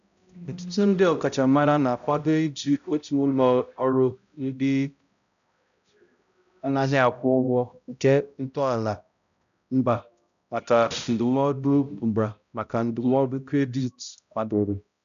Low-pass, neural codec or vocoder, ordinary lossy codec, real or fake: 7.2 kHz; codec, 16 kHz, 0.5 kbps, X-Codec, HuBERT features, trained on balanced general audio; none; fake